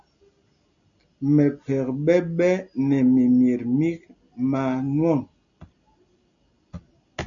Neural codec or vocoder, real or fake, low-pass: none; real; 7.2 kHz